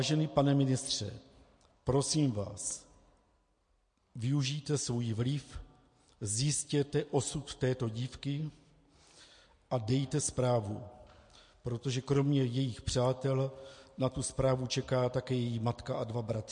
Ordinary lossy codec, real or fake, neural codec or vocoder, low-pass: MP3, 48 kbps; real; none; 9.9 kHz